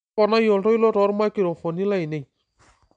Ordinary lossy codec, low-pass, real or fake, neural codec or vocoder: none; 9.9 kHz; real; none